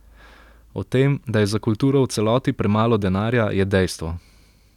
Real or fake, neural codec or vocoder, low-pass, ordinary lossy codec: real; none; 19.8 kHz; none